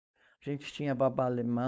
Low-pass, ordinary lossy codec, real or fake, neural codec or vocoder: none; none; fake; codec, 16 kHz, 4.8 kbps, FACodec